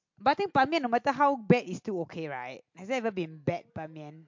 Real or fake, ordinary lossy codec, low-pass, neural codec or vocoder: real; MP3, 48 kbps; 7.2 kHz; none